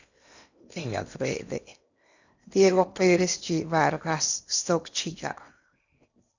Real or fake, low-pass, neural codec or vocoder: fake; 7.2 kHz; codec, 16 kHz in and 24 kHz out, 0.8 kbps, FocalCodec, streaming, 65536 codes